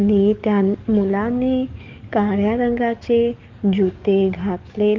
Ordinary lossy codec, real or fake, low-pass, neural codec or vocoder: Opus, 24 kbps; fake; 7.2 kHz; codec, 44.1 kHz, 7.8 kbps, DAC